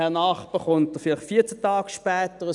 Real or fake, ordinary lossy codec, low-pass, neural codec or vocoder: real; none; 10.8 kHz; none